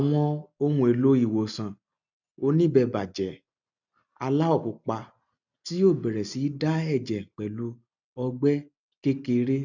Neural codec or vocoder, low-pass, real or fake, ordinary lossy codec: none; 7.2 kHz; real; none